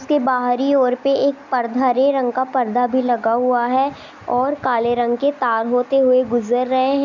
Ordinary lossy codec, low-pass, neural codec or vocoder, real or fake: none; 7.2 kHz; none; real